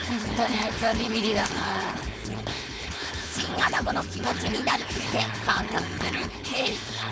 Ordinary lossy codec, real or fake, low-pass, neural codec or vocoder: none; fake; none; codec, 16 kHz, 4.8 kbps, FACodec